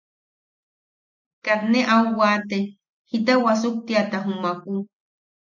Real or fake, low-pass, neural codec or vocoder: real; 7.2 kHz; none